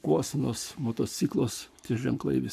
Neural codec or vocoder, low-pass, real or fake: codec, 44.1 kHz, 7.8 kbps, Pupu-Codec; 14.4 kHz; fake